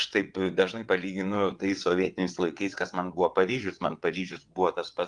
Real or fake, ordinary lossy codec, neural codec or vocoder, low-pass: fake; Opus, 24 kbps; vocoder, 22.05 kHz, 80 mel bands, WaveNeXt; 9.9 kHz